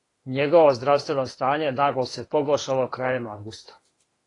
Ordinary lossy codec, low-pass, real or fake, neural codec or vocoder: AAC, 32 kbps; 10.8 kHz; fake; autoencoder, 48 kHz, 32 numbers a frame, DAC-VAE, trained on Japanese speech